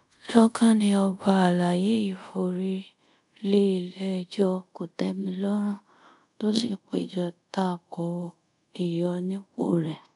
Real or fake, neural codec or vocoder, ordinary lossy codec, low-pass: fake; codec, 24 kHz, 0.5 kbps, DualCodec; none; 10.8 kHz